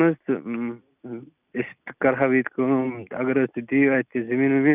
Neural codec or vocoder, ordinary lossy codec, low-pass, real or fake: none; none; 3.6 kHz; real